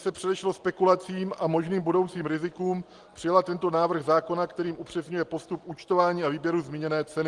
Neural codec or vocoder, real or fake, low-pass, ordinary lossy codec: none; real; 10.8 kHz; Opus, 24 kbps